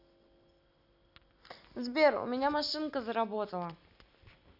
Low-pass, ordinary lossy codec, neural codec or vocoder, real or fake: 5.4 kHz; none; none; real